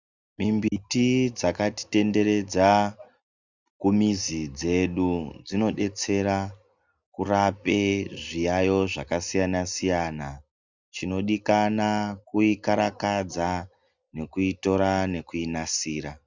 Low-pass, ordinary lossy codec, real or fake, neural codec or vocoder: 7.2 kHz; Opus, 64 kbps; real; none